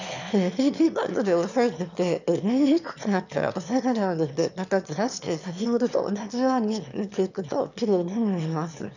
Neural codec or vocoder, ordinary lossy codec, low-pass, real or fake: autoencoder, 22.05 kHz, a latent of 192 numbers a frame, VITS, trained on one speaker; none; 7.2 kHz; fake